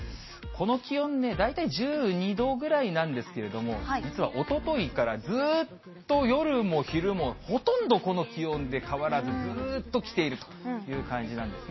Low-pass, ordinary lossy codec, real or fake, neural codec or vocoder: 7.2 kHz; MP3, 24 kbps; real; none